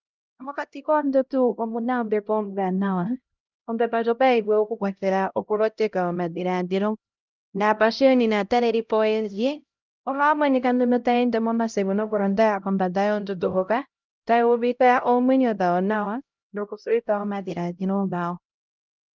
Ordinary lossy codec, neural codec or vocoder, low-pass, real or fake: Opus, 24 kbps; codec, 16 kHz, 0.5 kbps, X-Codec, HuBERT features, trained on LibriSpeech; 7.2 kHz; fake